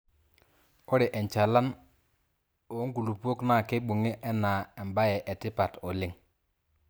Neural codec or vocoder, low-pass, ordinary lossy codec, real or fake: none; none; none; real